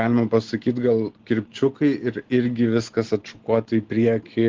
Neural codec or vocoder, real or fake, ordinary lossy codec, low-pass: none; real; Opus, 16 kbps; 7.2 kHz